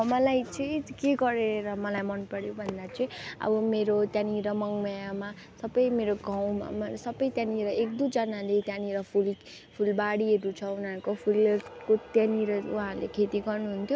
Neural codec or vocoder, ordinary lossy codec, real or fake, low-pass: none; none; real; none